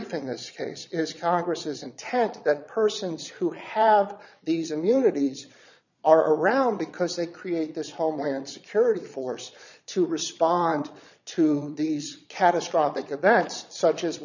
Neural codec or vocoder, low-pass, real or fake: vocoder, 22.05 kHz, 80 mel bands, Vocos; 7.2 kHz; fake